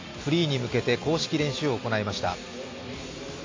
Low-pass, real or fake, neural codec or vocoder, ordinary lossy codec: 7.2 kHz; real; none; AAC, 32 kbps